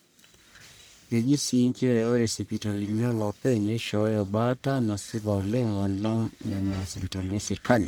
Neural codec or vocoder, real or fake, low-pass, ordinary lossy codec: codec, 44.1 kHz, 1.7 kbps, Pupu-Codec; fake; none; none